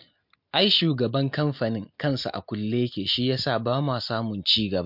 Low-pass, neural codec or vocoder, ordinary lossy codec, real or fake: 5.4 kHz; none; MP3, 48 kbps; real